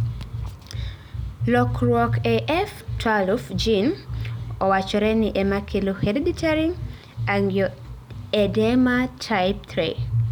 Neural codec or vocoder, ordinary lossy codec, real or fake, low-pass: none; none; real; none